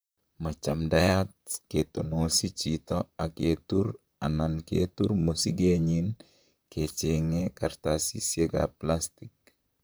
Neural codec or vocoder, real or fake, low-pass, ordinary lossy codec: vocoder, 44.1 kHz, 128 mel bands, Pupu-Vocoder; fake; none; none